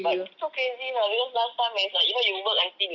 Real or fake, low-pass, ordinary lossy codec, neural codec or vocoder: real; 7.2 kHz; Opus, 64 kbps; none